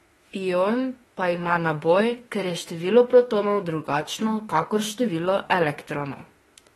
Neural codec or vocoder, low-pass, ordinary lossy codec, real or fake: autoencoder, 48 kHz, 32 numbers a frame, DAC-VAE, trained on Japanese speech; 19.8 kHz; AAC, 32 kbps; fake